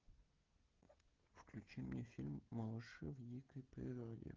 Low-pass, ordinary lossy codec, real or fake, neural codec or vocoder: 7.2 kHz; Opus, 16 kbps; fake; codec, 16 kHz, 16 kbps, FreqCodec, smaller model